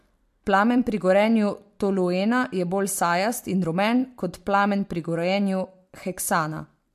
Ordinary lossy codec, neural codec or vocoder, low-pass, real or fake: MP3, 64 kbps; vocoder, 44.1 kHz, 128 mel bands every 256 samples, BigVGAN v2; 14.4 kHz; fake